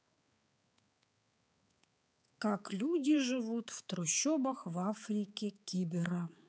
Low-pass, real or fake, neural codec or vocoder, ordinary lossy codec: none; fake; codec, 16 kHz, 4 kbps, X-Codec, HuBERT features, trained on balanced general audio; none